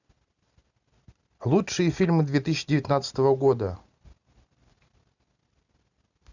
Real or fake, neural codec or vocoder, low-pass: real; none; 7.2 kHz